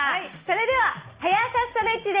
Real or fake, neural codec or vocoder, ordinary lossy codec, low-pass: real; none; Opus, 24 kbps; 3.6 kHz